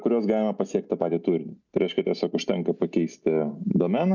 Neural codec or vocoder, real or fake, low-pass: none; real; 7.2 kHz